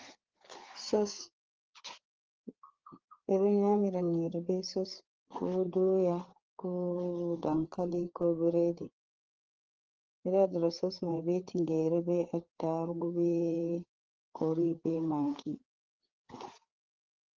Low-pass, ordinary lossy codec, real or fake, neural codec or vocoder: 7.2 kHz; Opus, 16 kbps; fake; codec, 16 kHz, 4 kbps, FreqCodec, larger model